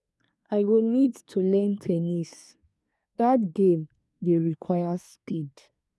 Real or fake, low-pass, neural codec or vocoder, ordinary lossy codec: fake; none; codec, 24 kHz, 1 kbps, SNAC; none